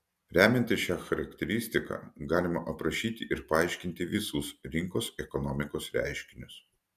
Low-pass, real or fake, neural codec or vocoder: 14.4 kHz; real; none